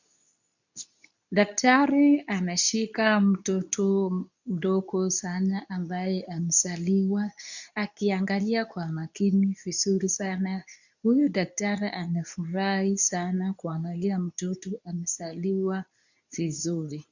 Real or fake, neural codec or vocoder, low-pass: fake; codec, 24 kHz, 0.9 kbps, WavTokenizer, medium speech release version 2; 7.2 kHz